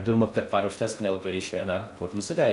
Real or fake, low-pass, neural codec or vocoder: fake; 10.8 kHz; codec, 16 kHz in and 24 kHz out, 0.6 kbps, FocalCodec, streaming, 4096 codes